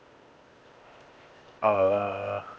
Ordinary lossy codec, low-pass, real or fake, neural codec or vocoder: none; none; fake; codec, 16 kHz, 0.8 kbps, ZipCodec